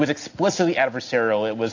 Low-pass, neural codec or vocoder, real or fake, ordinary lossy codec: 7.2 kHz; none; real; AAC, 48 kbps